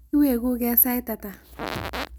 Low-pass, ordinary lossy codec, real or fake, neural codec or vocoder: none; none; real; none